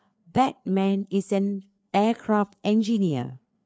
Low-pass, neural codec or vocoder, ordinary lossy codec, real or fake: none; codec, 16 kHz, 4 kbps, FreqCodec, larger model; none; fake